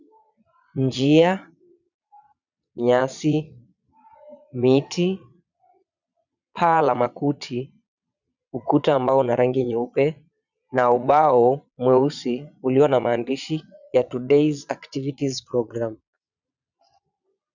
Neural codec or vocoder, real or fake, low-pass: vocoder, 22.05 kHz, 80 mel bands, Vocos; fake; 7.2 kHz